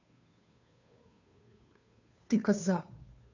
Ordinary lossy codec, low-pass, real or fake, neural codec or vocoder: none; 7.2 kHz; fake; codec, 16 kHz, 2 kbps, FunCodec, trained on Chinese and English, 25 frames a second